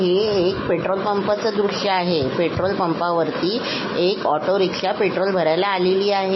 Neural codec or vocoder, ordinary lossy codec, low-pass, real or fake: none; MP3, 24 kbps; 7.2 kHz; real